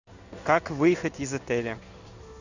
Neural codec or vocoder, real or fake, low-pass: codec, 16 kHz in and 24 kHz out, 1 kbps, XY-Tokenizer; fake; 7.2 kHz